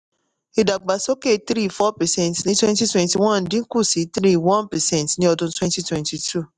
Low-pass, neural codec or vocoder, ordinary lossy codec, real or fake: 10.8 kHz; none; none; real